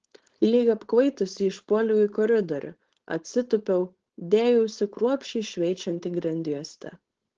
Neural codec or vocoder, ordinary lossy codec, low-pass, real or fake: codec, 16 kHz, 4.8 kbps, FACodec; Opus, 16 kbps; 7.2 kHz; fake